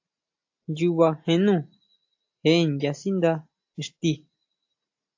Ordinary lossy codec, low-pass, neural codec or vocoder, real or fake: AAC, 48 kbps; 7.2 kHz; none; real